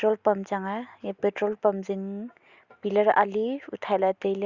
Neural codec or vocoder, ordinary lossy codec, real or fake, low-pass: none; Opus, 64 kbps; real; 7.2 kHz